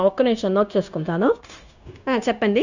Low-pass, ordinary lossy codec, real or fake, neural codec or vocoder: 7.2 kHz; none; fake; codec, 16 kHz, 0.9 kbps, LongCat-Audio-Codec